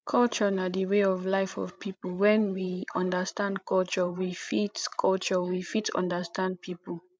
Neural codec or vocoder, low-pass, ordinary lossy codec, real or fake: codec, 16 kHz, 16 kbps, FreqCodec, larger model; none; none; fake